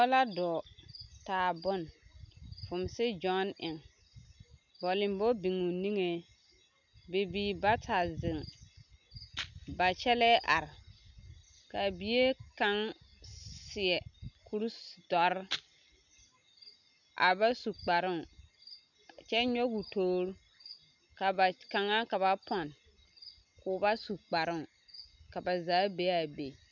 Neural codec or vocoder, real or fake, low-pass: none; real; 7.2 kHz